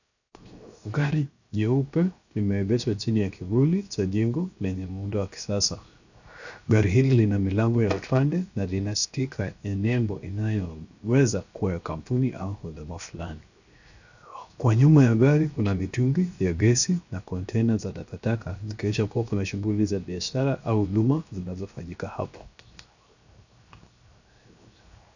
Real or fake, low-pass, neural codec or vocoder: fake; 7.2 kHz; codec, 16 kHz, 0.7 kbps, FocalCodec